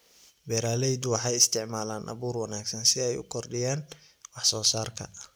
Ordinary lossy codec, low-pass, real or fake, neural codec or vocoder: none; none; real; none